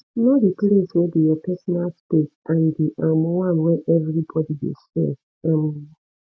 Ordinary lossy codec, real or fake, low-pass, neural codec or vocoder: none; real; none; none